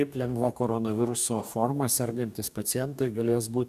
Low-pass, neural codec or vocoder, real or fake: 14.4 kHz; codec, 44.1 kHz, 2.6 kbps, DAC; fake